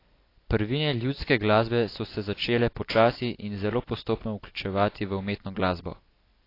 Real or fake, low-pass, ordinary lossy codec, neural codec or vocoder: real; 5.4 kHz; AAC, 32 kbps; none